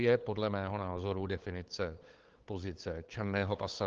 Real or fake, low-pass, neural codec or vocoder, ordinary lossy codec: fake; 7.2 kHz; codec, 16 kHz, 8 kbps, FunCodec, trained on LibriTTS, 25 frames a second; Opus, 16 kbps